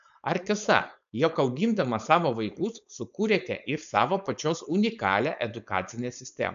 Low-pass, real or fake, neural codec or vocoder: 7.2 kHz; fake; codec, 16 kHz, 4.8 kbps, FACodec